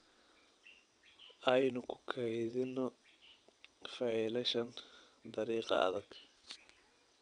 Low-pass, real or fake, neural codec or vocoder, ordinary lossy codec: 9.9 kHz; fake; vocoder, 22.05 kHz, 80 mel bands, WaveNeXt; MP3, 96 kbps